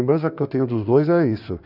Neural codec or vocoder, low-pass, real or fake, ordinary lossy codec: codec, 16 kHz in and 24 kHz out, 2.2 kbps, FireRedTTS-2 codec; 5.4 kHz; fake; none